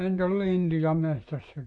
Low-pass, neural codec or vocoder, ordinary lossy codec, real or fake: 9.9 kHz; vocoder, 44.1 kHz, 128 mel bands, Pupu-Vocoder; AAC, 48 kbps; fake